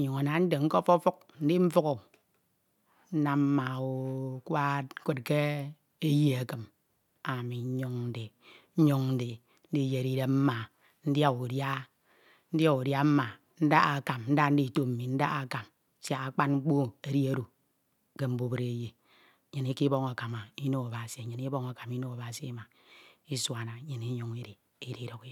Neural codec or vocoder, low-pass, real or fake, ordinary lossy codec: none; 19.8 kHz; real; none